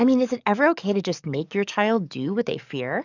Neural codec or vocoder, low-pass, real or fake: codec, 44.1 kHz, 7.8 kbps, DAC; 7.2 kHz; fake